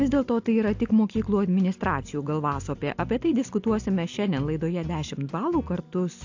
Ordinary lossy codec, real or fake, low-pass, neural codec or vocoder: AAC, 48 kbps; real; 7.2 kHz; none